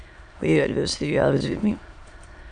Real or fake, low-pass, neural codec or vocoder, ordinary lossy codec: fake; 9.9 kHz; autoencoder, 22.05 kHz, a latent of 192 numbers a frame, VITS, trained on many speakers; MP3, 96 kbps